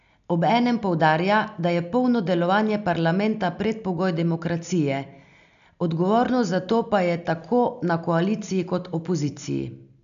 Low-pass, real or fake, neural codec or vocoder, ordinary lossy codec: 7.2 kHz; real; none; MP3, 96 kbps